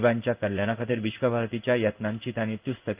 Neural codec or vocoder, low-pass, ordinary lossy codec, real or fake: codec, 16 kHz in and 24 kHz out, 1 kbps, XY-Tokenizer; 3.6 kHz; Opus, 32 kbps; fake